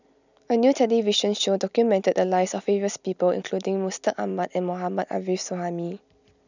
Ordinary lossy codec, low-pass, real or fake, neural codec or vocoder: none; 7.2 kHz; real; none